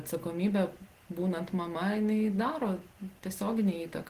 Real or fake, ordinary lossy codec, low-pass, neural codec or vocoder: real; Opus, 16 kbps; 14.4 kHz; none